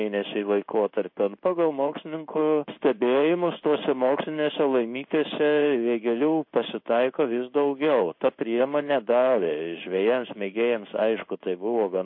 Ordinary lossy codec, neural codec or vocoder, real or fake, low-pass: MP3, 32 kbps; codec, 16 kHz in and 24 kHz out, 1 kbps, XY-Tokenizer; fake; 5.4 kHz